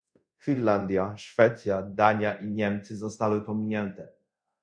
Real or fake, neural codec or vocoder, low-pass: fake; codec, 24 kHz, 0.5 kbps, DualCodec; 9.9 kHz